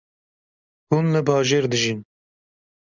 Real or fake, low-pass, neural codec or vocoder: real; 7.2 kHz; none